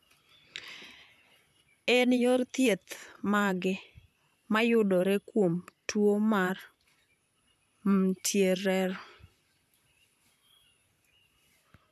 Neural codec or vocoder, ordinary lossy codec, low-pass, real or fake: vocoder, 44.1 kHz, 128 mel bands, Pupu-Vocoder; none; 14.4 kHz; fake